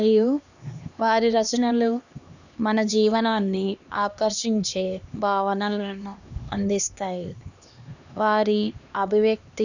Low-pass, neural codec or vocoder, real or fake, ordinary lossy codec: 7.2 kHz; codec, 16 kHz, 2 kbps, X-Codec, HuBERT features, trained on LibriSpeech; fake; none